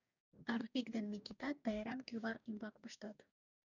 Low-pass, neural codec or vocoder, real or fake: 7.2 kHz; codec, 44.1 kHz, 2.6 kbps, DAC; fake